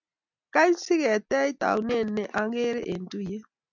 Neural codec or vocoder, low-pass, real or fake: none; 7.2 kHz; real